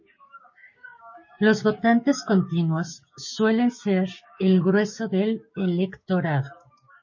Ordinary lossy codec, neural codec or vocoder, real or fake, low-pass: MP3, 32 kbps; codec, 16 kHz, 6 kbps, DAC; fake; 7.2 kHz